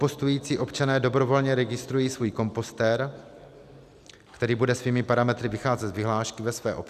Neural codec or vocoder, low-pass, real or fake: none; 14.4 kHz; real